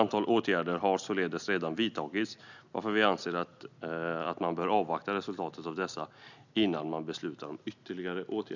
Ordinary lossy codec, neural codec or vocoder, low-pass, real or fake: none; none; 7.2 kHz; real